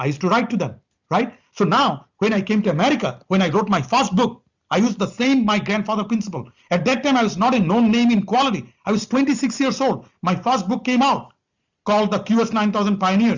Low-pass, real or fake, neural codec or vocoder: 7.2 kHz; real; none